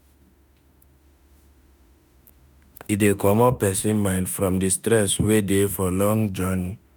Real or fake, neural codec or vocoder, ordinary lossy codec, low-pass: fake; autoencoder, 48 kHz, 32 numbers a frame, DAC-VAE, trained on Japanese speech; none; none